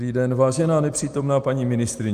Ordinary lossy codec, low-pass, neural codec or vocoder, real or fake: Opus, 32 kbps; 14.4 kHz; none; real